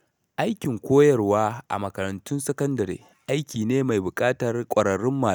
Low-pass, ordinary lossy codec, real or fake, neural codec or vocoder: none; none; real; none